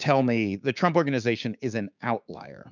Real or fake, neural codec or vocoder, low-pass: fake; codec, 16 kHz, 6 kbps, DAC; 7.2 kHz